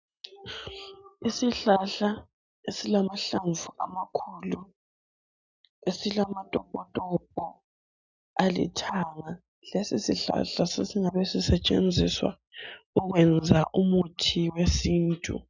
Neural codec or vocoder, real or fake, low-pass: none; real; 7.2 kHz